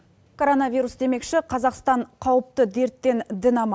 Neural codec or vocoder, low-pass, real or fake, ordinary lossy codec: none; none; real; none